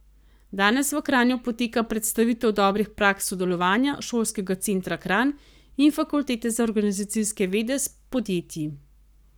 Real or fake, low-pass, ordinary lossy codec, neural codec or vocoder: fake; none; none; codec, 44.1 kHz, 7.8 kbps, Pupu-Codec